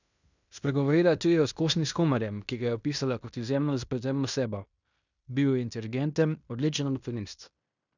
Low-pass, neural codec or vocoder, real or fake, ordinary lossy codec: 7.2 kHz; codec, 16 kHz in and 24 kHz out, 0.9 kbps, LongCat-Audio-Codec, fine tuned four codebook decoder; fake; none